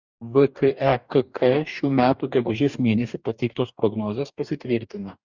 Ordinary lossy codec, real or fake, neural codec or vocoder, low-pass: Opus, 64 kbps; fake; codec, 44.1 kHz, 2.6 kbps, DAC; 7.2 kHz